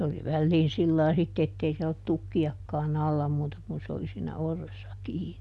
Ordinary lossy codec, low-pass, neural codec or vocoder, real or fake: none; none; none; real